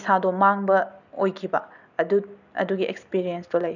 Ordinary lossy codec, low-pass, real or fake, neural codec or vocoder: none; 7.2 kHz; real; none